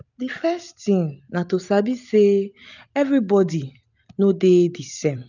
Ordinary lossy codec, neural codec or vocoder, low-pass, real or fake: none; none; 7.2 kHz; real